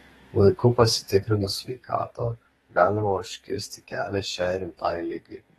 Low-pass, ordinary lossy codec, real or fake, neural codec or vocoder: 14.4 kHz; AAC, 32 kbps; fake; codec, 32 kHz, 1.9 kbps, SNAC